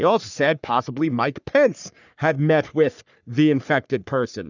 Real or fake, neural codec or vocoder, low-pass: fake; codec, 44.1 kHz, 3.4 kbps, Pupu-Codec; 7.2 kHz